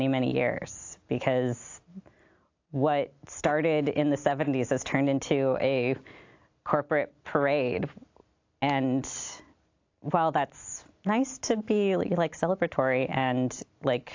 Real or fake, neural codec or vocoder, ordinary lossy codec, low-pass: real; none; AAC, 48 kbps; 7.2 kHz